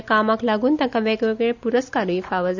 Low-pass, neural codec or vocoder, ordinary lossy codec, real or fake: 7.2 kHz; none; none; real